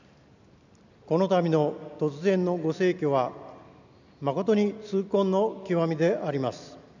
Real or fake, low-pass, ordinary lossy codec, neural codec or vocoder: real; 7.2 kHz; none; none